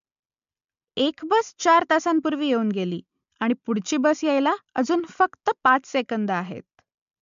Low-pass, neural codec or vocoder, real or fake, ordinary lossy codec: 7.2 kHz; none; real; MP3, 64 kbps